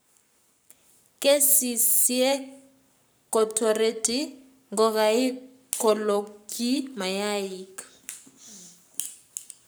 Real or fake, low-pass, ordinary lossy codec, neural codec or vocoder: fake; none; none; codec, 44.1 kHz, 7.8 kbps, Pupu-Codec